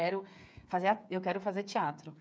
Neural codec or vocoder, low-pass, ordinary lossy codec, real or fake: codec, 16 kHz, 8 kbps, FreqCodec, smaller model; none; none; fake